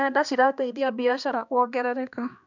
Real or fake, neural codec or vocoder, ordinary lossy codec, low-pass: fake; codec, 16 kHz, 2 kbps, X-Codec, HuBERT features, trained on balanced general audio; none; 7.2 kHz